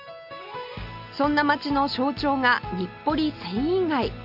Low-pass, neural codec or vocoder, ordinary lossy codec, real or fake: 5.4 kHz; none; none; real